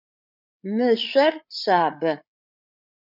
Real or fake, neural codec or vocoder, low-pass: fake; codec, 16 kHz, 8 kbps, FreqCodec, larger model; 5.4 kHz